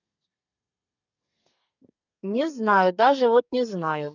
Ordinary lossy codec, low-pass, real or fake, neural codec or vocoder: none; 7.2 kHz; fake; codec, 32 kHz, 1.9 kbps, SNAC